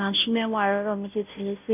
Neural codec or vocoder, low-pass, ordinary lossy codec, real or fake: codec, 16 kHz, 0.5 kbps, FunCodec, trained on Chinese and English, 25 frames a second; 3.6 kHz; none; fake